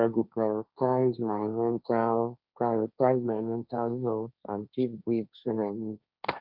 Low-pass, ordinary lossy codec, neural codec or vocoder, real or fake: 5.4 kHz; none; codec, 16 kHz, 1.1 kbps, Voila-Tokenizer; fake